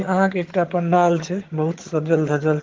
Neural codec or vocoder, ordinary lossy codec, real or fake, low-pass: vocoder, 22.05 kHz, 80 mel bands, HiFi-GAN; Opus, 16 kbps; fake; 7.2 kHz